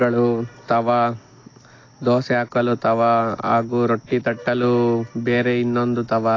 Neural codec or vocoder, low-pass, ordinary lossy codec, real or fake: none; 7.2 kHz; AAC, 32 kbps; real